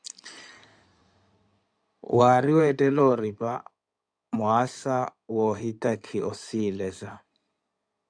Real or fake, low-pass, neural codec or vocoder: fake; 9.9 kHz; codec, 16 kHz in and 24 kHz out, 2.2 kbps, FireRedTTS-2 codec